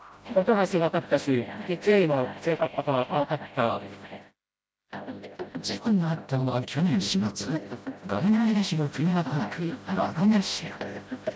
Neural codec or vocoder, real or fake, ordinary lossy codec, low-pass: codec, 16 kHz, 0.5 kbps, FreqCodec, smaller model; fake; none; none